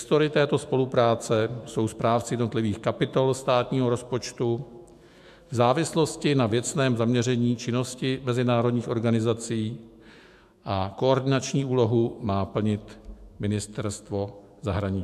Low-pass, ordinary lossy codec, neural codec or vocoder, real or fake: 14.4 kHz; AAC, 96 kbps; autoencoder, 48 kHz, 128 numbers a frame, DAC-VAE, trained on Japanese speech; fake